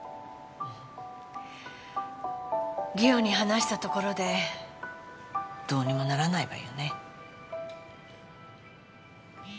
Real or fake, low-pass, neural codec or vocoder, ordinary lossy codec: real; none; none; none